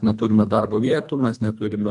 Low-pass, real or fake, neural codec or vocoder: 10.8 kHz; fake; codec, 24 kHz, 1.5 kbps, HILCodec